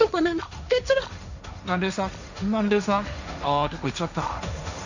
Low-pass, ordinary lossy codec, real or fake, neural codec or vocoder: 7.2 kHz; none; fake; codec, 16 kHz, 1.1 kbps, Voila-Tokenizer